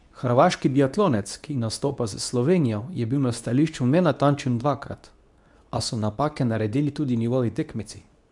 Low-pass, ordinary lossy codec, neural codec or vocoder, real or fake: 10.8 kHz; none; codec, 24 kHz, 0.9 kbps, WavTokenizer, medium speech release version 2; fake